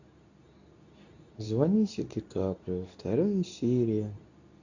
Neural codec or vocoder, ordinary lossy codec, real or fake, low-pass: codec, 24 kHz, 0.9 kbps, WavTokenizer, medium speech release version 2; none; fake; 7.2 kHz